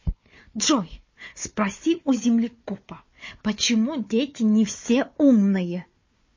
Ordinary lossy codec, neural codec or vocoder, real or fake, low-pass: MP3, 32 kbps; codec, 16 kHz, 4 kbps, FunCodec, trained on Chinese and English, 50 frames a second; fake; 7.2 kHz